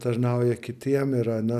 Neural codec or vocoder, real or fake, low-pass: none; real; 14.4 kHz